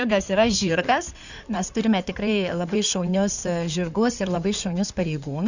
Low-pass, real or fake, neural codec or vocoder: 7.2 kHz; fake; codec, 16 kHz in and 24 kHz out, 2.2 kbps, FireRedTTS-2 codec